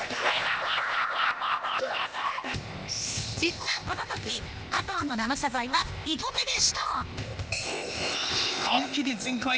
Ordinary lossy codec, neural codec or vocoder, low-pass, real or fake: none; codec, 16 kHz, 0.8 kbps, ZipCodec; none; fake